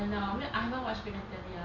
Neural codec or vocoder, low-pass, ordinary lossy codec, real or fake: none; 7.2 kHz; none; real